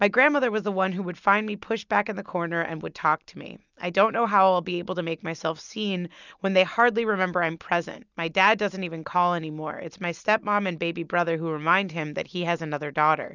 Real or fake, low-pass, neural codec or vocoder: real; 7.2 kHz; none